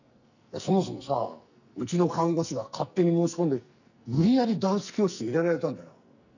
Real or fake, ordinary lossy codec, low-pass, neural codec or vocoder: fake; none; 7.2 kHz; codec, 44.1 kHz, 2.6 kbps, SNAC